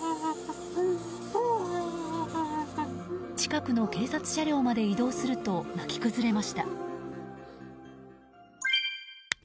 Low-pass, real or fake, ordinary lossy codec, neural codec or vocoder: none; real; none; none